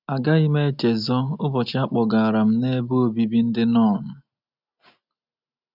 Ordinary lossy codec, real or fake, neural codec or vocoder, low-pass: none; real; none; 5.4 kHz